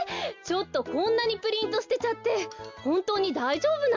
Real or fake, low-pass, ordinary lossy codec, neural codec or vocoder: real; 7.2 kHz; MP3, 64 kbps; none